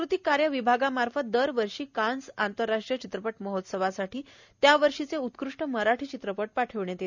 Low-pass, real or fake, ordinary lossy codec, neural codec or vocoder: 7.2 kHz; real; none; none